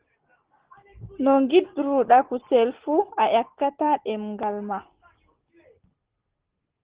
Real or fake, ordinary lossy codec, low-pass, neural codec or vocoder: real; Opus, 16 kbps; 3.6 kHz; none